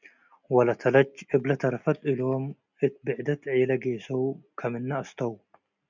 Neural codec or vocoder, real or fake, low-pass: none; real; 7.2 kHz